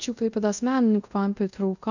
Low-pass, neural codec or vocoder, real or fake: 7.2 kHz; codec, 24 kHz, 0.5 kbps, DualCodec; fake